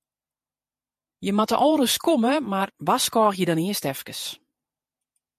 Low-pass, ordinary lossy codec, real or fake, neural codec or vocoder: 14.4 kHz; MP3, 64 kbps; fake; vocoder, 48 kHz, 128 mel bands, Vocos